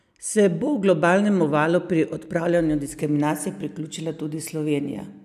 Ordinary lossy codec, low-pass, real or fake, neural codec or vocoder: none; 14.4 kHz; real; none